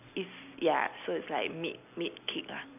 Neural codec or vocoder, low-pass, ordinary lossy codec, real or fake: none; 3.6 kHz; none; real